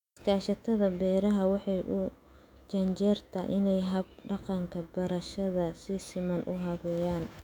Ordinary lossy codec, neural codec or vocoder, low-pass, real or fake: none; autoencoder, 48 kHz, 128 numbers a frame, DAC-VAE, trained on Japanese speech; 19.8 kHz; fake